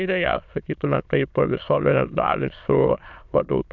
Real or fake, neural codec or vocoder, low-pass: fake; autoencoder, 22.05 kHz, a latent of 192 numbers a frame, VITS, trained on many speakers; 7.2 kHz